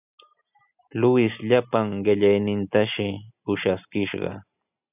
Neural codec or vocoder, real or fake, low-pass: none; real; 3.6 kHz